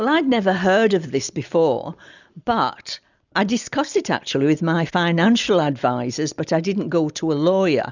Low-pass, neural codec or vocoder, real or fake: 7.2 kHz; none; real